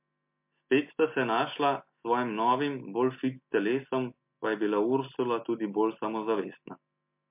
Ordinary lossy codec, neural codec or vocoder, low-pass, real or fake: MP3, 32 kbps; none; 3.6 kHz; real